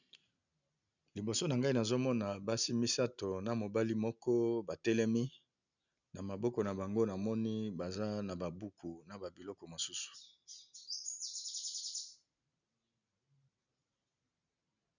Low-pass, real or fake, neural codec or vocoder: 7.2 kHz; real; none